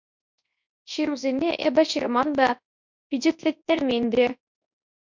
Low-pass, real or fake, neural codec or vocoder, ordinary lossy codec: 7.2 kHz; fake; codec, 24 kHz, 0.9 kbps, WavTokenizer, large speech release; MP3, 64 kbps